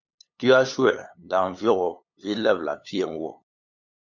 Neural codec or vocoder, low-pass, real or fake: codec, 16 kHz, 8 kbps, FunCodec, trained on LibriTTS, 25 frames a second; 7.2 kHz; fake